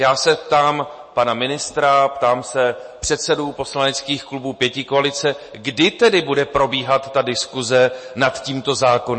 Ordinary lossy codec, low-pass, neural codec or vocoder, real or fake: MP3, 32 kbps; 9.9 kHz; none; real